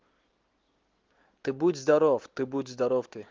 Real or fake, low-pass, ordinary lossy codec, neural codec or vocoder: real; 7.2 kHz; Opus, 16 kbps; none